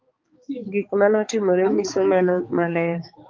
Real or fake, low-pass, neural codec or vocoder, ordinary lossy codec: fake; 7.2 kHz; codec, 16 kHz, 4 kbps, X-Codec, HuBERT features, trained on balanced general audio; Opus, 24 kbps